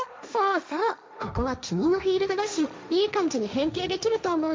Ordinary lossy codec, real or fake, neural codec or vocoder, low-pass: none; fake; codec, 16 kHz, 1.1 kbps, Voila-Tokenizer; none